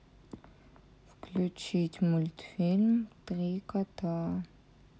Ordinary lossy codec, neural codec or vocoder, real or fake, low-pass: none; none; real; none